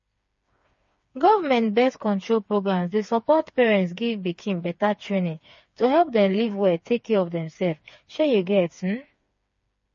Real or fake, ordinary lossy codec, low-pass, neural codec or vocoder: fake; MP3, 32 kbps; 7.2 kHz; codec, 16 kHz, 4 kbps, FreqCodec, smaller model